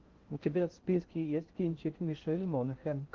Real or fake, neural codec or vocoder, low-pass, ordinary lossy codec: fake; codec, 16 kHz in and 24 kHz out, 0.6 kbps, FocalCodec, streaming, 2048 codes; 7.2 kHz; Opus, 16 kbps